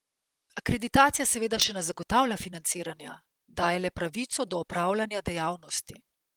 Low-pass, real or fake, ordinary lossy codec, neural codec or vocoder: 19.8 kHz; fake; Opus, 24 kbps; vocoder, 44.1 kHz, 128 mel bands, Pupu-Vocoder